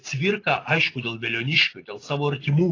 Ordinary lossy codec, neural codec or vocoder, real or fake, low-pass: AAC, 32 kbps; none; real; 7.2 kHz